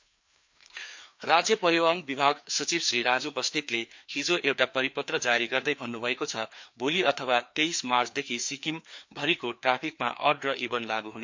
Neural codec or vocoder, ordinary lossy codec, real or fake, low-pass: codec, 16 kHz, 2 kbps, FreqCodec, larger model; MP3, 48 kbps; fake; 7.2 kHz